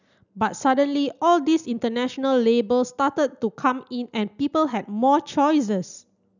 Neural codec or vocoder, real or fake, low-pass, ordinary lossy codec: none; real; 7.2 kHz; none